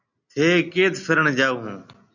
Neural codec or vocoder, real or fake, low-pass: none; real; 7.2 kHz